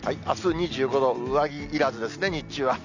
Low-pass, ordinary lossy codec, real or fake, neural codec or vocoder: 7.2 kHz; none; real; none